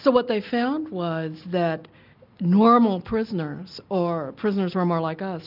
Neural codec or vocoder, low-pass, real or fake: none; 5.4 kHz; real